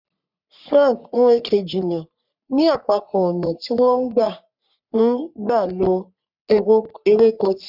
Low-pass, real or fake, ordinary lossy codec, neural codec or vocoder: 5.4 kHz; fake; none; codec, 44.1 kHz, 3.4 kbps, Pupu-Codec